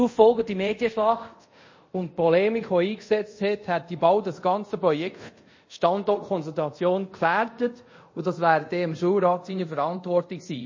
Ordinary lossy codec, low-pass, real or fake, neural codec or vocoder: MP3, 32 kbps; 7.2 kHz; fake; codec, 24 kHz, 0.5 kbps, DualCodec